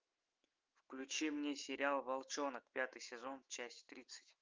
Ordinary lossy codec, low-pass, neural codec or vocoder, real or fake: Opus, 32 kbps; 7.2 kHz; autoencoder, 48 kHz, 128 numbers a frame, DAC-VAE, trained on Japanese speech; fake